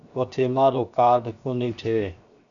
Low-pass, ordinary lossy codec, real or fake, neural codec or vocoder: 7.2 kHz; MP3, 96 kbps; fake; codec, 16 kHz, 0.7 kbps, FocalCodec